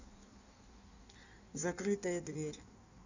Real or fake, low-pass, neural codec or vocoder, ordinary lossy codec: fake; 7.2 kHz; codec, 16 kHz in and 24 kHz out, 1.1 kbps, FireRedTTS-2 codec; none